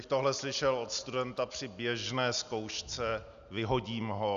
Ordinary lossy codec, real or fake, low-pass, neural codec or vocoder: AAC, 96 kbps; real; 7.2 kHz; none